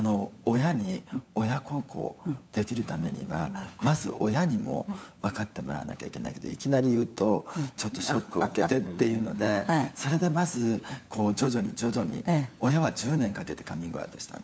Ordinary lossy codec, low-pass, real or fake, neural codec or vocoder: none; none; fake; codec, 16 kHz, 4 kbps, FunCodec, trained on LibriTTS, 50 frames a second